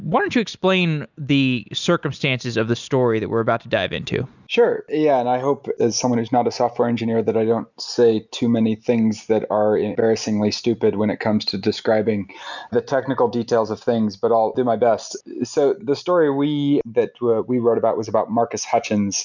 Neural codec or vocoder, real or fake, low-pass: none; real; 7.2 kHz